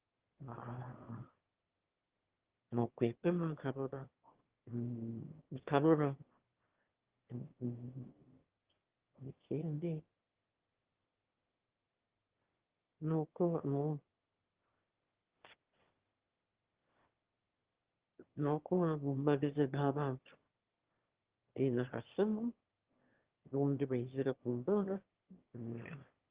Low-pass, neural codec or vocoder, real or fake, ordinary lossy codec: 3.6 kHz; autoencoder, 22.05 kHz, a latent of 192 numbers a frame, VITS, trained on one speaker; fake; Opus, 16 kbps